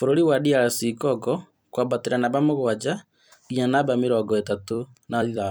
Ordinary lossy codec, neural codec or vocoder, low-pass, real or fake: none; vocoder, 44.1 kHz, 128 mel bands every 256 samples, BigVGAN v2; none; fake